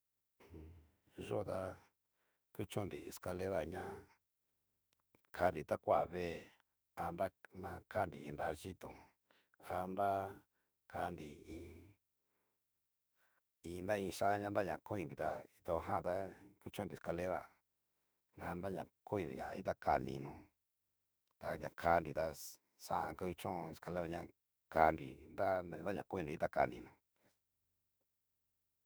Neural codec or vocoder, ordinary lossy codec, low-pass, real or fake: autoencoder, 48 kHz, 32 numbers a frame, DAC-VAE, trained on Japanese speech; none; none; fake